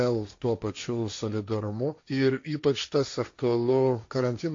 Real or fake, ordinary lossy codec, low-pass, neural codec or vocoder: fake; AAC, 48 kbps; 7.2 kHz; codec, 16 kHz, 1.1 kbps, Voila-Tokenizer